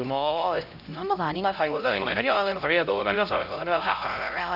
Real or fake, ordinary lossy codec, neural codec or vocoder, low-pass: fake; none; codec, 16 kHz, 0.5 kbps, X-Codec, HuBERT features, trained on LibriSpeech; 5.4 kHz